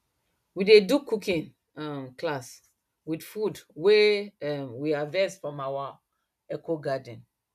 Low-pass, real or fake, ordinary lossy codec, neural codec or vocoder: 14.4 kHz; real; none; none